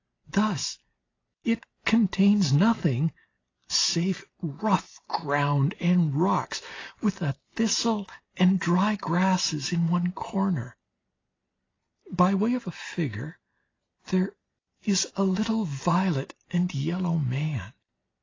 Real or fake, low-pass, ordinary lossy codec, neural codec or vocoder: real; 7.2 kHz; AAC, 32 kbps; none